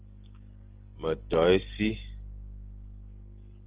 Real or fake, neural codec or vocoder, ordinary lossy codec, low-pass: real; none; Opus, 16 kbps; 3.6 kHz